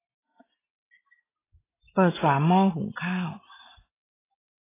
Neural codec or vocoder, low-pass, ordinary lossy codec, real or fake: none; 3.6 kHz; AAC, 16 kbps; real